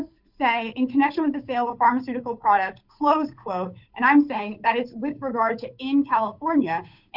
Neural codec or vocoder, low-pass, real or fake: codec, 16 kHz, 8 kbps, FunCodec, trained on Chinese and English, 25 frames a second; 5.4 kHz; fake